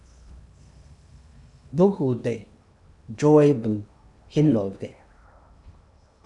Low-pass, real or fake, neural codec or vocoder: 10.8 kHz; fake; codec, 16 kHz in and 24 kHz out, 0.8 kbps, FocalCodec, streaming, 65536 codes